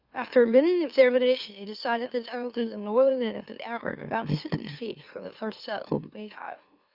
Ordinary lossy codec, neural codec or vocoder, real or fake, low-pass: Opus, 64 kbps; autoencoder, 44.1 kHz, a latent of 192 numbers a frame, MeloTTS; fake; 5.4 kHz